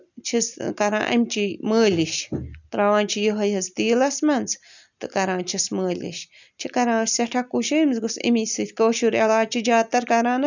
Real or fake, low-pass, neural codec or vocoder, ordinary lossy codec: real; 7.2 kHz; none; none